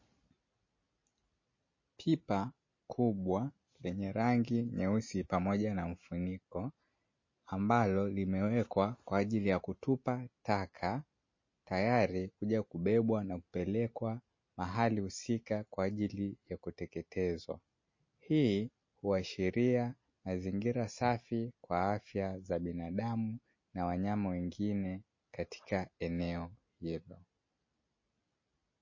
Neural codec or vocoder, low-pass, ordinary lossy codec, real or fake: none; 7.2 kHz; MP3, 32 kbps; real